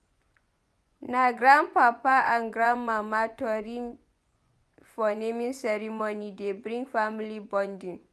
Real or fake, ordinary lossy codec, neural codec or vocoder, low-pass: fake; none; vocoder, 24 kHz, 100 mel bands, Vocos; none